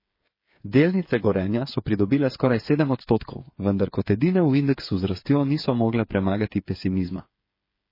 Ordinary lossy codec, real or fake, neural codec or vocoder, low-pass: MP3, 24 kbps; fake; codec, 16 kHz, 8 kbps, FreqCodec, smaller model; 5.4 kHz